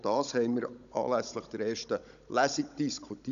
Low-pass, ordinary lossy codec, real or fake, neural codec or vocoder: 7.2 kHz; none; fake; codec, 16 kHz, 16 kbps, FunCodec, trained on Chinese and English, 50 frames a second